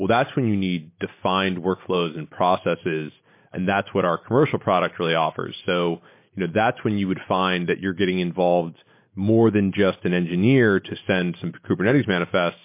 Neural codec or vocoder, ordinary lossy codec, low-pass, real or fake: none; MP3, 24 kbps; 3.6 kHz; real